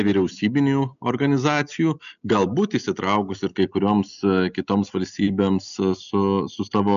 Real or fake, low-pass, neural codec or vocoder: real; 7.2 kHz; none